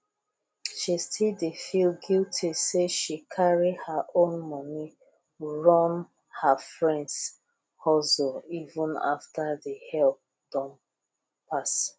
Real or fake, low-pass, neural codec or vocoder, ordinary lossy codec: real; none; none; none